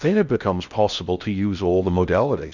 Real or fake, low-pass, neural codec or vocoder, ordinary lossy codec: fake; 7.2 kHz; codec, 16 kHz in and 24 kHz out, 0.6 kbps, FocalCodec, streaming, 4096 codes; Opus, 64 kbps